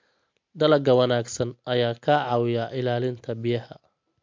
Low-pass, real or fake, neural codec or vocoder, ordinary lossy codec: 7.2 kHz; real; none; MP3, 48 kbps